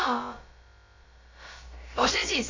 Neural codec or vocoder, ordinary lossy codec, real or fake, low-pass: codec, 16 kHz, about 1 kbps, DyCAST, with the encoder's durations; AAC, 32 kbps; fake; 7.2 kHz